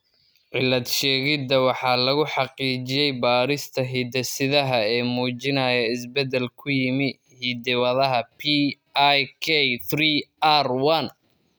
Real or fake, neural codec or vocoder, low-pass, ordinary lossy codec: real; none; none; none